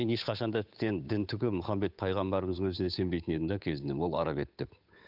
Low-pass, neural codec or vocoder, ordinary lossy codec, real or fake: 5.4 kHz; codec, 16 kHz, 8 kbps, FunCodec, trained on Chinese and English, 25 frames a second; none; fake